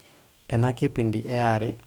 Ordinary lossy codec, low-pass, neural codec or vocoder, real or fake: Opus, 64 kbps; 19.8 kHz; codec, 44.1 kHz, 2.6 kbps, DAC; fake